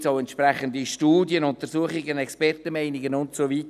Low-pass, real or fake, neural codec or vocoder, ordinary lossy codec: 14.4 kHz; real; none; none